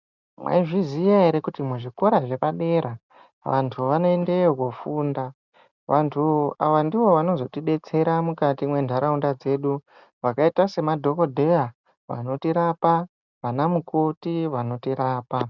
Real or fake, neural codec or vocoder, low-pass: real; none; 7.2 kHz